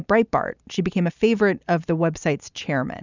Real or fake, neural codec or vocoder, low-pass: real; none; 7.2 kHz